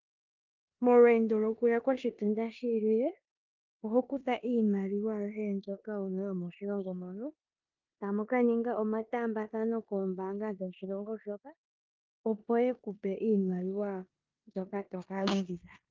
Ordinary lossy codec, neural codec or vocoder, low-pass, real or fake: Opus, 32 kbps; codec, 16 kHz in and 24 kHz out, 0.9 kbps, LongCat-Audio-Codec, four codebook decoder; 7.2 kHz; fake